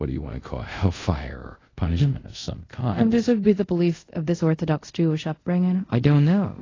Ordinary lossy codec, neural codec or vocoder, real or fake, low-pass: AAC, 32 kbps; codec, 24 kHz, 0.5 kbps, DualCodec; fake; 7.2 kHz